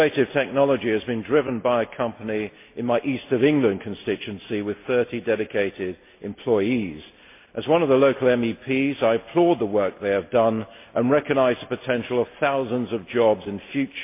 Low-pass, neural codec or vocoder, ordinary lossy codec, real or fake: 3.6 kHz; none; MP3, 24 kbps; real